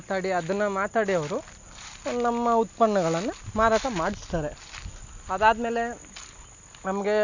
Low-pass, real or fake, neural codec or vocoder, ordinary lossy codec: 7.2 kHz; real; none; none